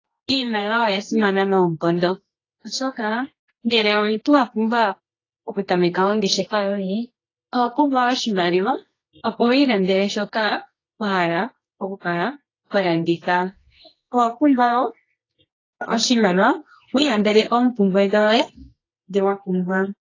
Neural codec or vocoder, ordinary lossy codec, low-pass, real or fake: codec, 24 kHz, 0.9 kbps, WavTokenizer, medium music audio release; AAC, 32 kbps; 7.2 kHz; fake